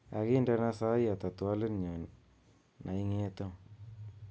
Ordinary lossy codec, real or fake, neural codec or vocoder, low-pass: none; real; none; none